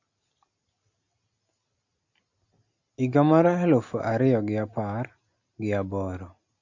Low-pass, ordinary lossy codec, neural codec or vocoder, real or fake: 7.2 kHz; Opus, 64 kbps; none; real